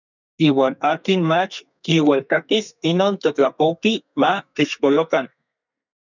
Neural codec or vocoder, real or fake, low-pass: codec, 32 kHz, 1.9 kbps, SNAC; fake; 7.2 kHz